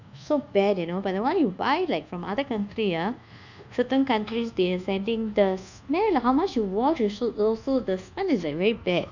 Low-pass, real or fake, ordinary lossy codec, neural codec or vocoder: 7.2 kHz; fake; none; codec, 24 kHz, 1.2 kbps, DualCodec